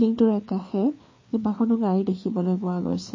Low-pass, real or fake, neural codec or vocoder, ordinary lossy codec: 7.2 kHz; fake; codec, 16 kHz, 4 kbps, FreqCodec, larger model; MP3, 32 kbps